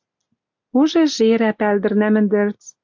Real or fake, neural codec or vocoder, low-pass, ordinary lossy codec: real; none; 7.2 kHz; AAC, 48 kbps